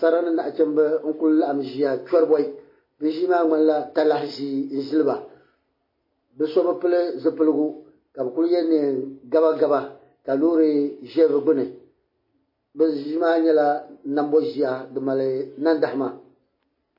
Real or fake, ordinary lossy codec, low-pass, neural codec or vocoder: real; MP3, 24 kbps; 5.4 kHz; none